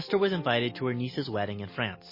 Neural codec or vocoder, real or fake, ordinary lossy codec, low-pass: none; real; MP3, 24 kbps; 5.4 kHz